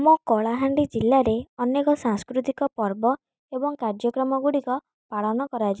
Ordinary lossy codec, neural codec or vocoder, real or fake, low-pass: none; none; real; none